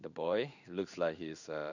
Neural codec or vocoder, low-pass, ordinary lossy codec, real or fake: codec, 16 kHz in and 24 kHz out, 1 kbps, XY-Tokenizer; 7.2 kHz; none; fake